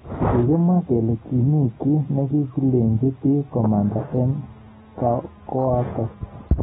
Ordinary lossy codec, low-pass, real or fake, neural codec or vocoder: AAC, 16 kbps; 19.8 kHz; real; none